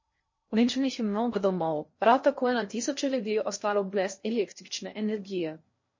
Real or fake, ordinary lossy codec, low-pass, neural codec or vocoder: fake; MP3, 32 kbps; 7.2 kHz; codec, 16 kHz in and 24 kHz out, 0.6 kbps, FocalCodec, streaming, 2048 codes